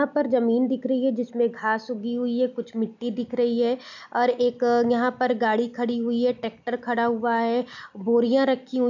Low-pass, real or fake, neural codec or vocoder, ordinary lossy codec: 7.2 kHz; real; none; none